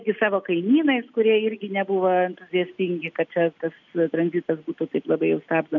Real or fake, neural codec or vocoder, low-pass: real; none; 7.2 kHz